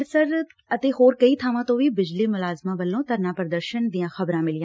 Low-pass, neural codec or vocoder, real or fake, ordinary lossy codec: none; none; real; none